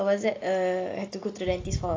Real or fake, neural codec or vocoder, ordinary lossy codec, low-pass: real; none; AAC, 32 kbps; 7.2 kHz